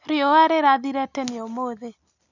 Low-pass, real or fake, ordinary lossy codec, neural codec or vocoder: 7.2 kHz; real; none; none